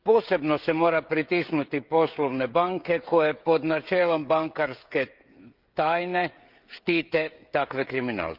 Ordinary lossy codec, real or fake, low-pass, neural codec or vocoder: Opus, 32 kbps; fake; 5.4 kHz; codec, 16 kHz, 16 kbps, FreqCodec, smaller model